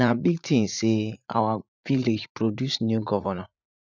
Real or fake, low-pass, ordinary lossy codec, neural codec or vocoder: fake; 7.2 kHz; none; vocoder, 44.1 kHz, 80 mel bands, Vocos